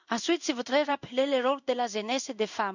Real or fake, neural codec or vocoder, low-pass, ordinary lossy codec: fake; codec, 16 kHz in and 24 kHz out, 1 kbps, XY-Tokenizer; 7.2 kHz; none